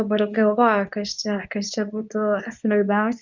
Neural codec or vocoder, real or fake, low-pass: codec, 24 kHz, 0.9 kbps, WavTokenizer, medium speech release version 2; fake; 7.2 kHz